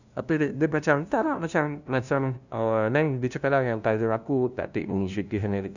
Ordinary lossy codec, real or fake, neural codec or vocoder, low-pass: none; fake; codec, 16 kHz, 0.5 kbps, FunCodec, trained on LibriTTS, 25 frames a second; 7.2 kHz